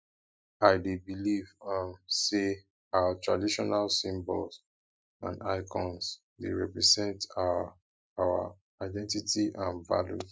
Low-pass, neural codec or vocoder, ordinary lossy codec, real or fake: none; none; none; real